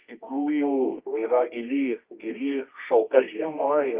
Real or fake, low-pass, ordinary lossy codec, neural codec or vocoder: fake; 3.6 kHz; Opus, 24 kbps; codec, 24 kHz, 0.9 kbps, WavTokenizer, medium music audio release